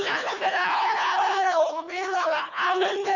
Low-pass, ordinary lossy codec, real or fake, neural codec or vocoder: 7.2 kHz; none; fake; codec, 24 kHz, 1.5 kbps, HILCodec